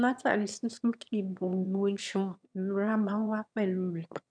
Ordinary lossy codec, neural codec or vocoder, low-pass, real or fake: none; autoencoder, 22.05 kHz, a latent of 192 numbers a frame, VITS, trained on one speaker; 9.9 kHz; fake